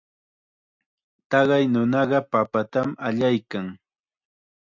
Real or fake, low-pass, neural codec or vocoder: real; 7.2 kHz; none